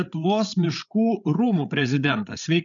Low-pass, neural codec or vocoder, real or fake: 7.2 kHz; codec, 16 kHz, 8 kbps, FreqCodec, larger model; fake